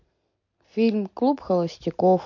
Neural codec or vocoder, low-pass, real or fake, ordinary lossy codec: none; 7.2 kHz; real; MP3, 48 kbps